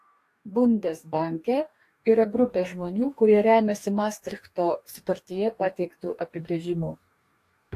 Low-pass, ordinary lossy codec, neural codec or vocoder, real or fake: 14.4 kHz; AAC, 64 kbps; codec, 44.1 kHz, 2.6 kbps, DAC; fake